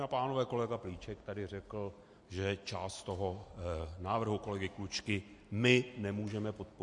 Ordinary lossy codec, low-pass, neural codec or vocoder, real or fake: MP3, 48 kbps; 10.8 kHz; none; real